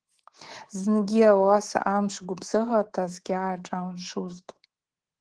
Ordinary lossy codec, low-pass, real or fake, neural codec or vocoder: Opus, 16 kbps; 9.9 kHz; fake; codec, 24 kHz, 3.1 kbps, DualCodec